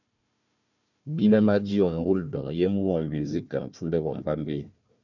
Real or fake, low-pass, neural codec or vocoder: fake; 7.2 kHz; codec, 16 kHz, 1 kbps, FunCodec, trained on Chinese and English, 50 frames a second